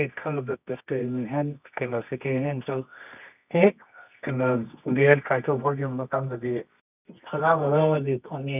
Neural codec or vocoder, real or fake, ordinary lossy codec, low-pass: codec, 24 kHz, 0.9 kbps, WavTokenizer, medium music audio release; fake; none; 3.6 kHz